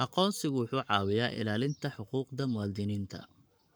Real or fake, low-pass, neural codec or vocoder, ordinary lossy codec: fake; none; codec, 44.1 kHz, 7.8 kbps, Pupu-Codec; none